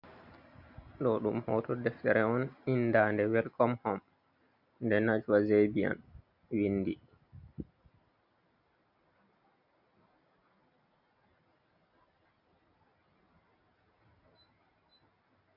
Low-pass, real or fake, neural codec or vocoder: 5.4 kHz; real; none